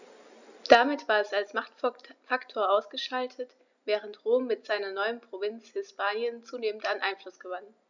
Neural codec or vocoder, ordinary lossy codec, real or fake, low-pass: none; none; real; 7.2 kHz